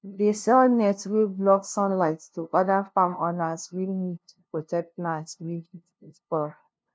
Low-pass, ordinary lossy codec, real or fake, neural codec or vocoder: none; none; fake; codec, 16 kHz, 0.5 kbps, FunCodec, trained on LibriTTS, 25 frames a second